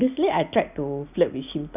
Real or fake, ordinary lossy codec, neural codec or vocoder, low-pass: real; none; none; 3.6 kHz